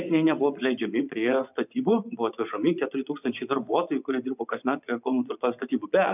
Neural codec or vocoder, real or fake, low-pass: vocoder, 24 kHz, 100 mel bands, Vocos; fake; 3.6 kHz